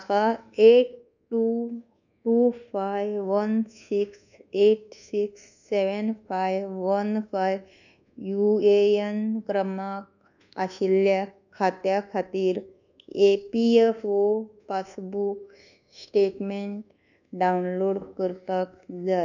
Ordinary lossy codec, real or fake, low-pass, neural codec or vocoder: none; fake; 7.2 kHz; codec, 24 kHz, 1.2 kbps, DualCodec